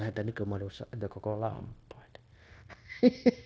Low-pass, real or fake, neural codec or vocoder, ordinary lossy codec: none; fake; codec, 16 kHz, 0.9 kbps, LongCat-Audio-Codec; none